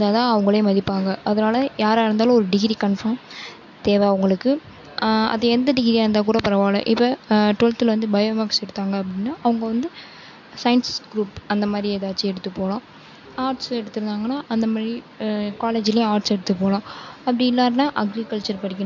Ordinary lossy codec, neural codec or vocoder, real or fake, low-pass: MP3, 64 kbps; none; real; 7.2 kHz